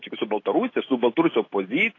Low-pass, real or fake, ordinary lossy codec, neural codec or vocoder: 7.2 kHz; real; AAC, 32 kbps; none